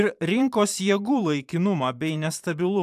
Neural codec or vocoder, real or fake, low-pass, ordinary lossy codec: vocoder, 44.1 kHz, 128 mel bands every 512 samples, BigVGAN v2; fake; 14.4 kHz; AAC, 96 kbps